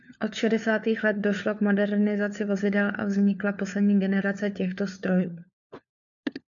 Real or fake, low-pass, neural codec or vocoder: fake; 7.2 kHz; codec, 16 kHz, 4 kbps, FunCodec, trained on LibriTTS, 50 frames a second